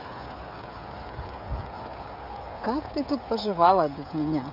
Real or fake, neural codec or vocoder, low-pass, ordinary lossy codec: fake; codec, 16 kHz, 16 kbps, FreqCodec, smaller model; 5.4 kHz; none